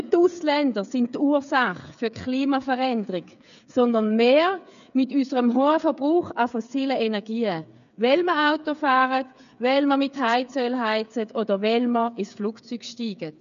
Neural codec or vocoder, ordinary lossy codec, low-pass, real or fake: codec, 16 kHz, 8 kbps, FreqCodec, smaller model; none; 7.2 kHz; fake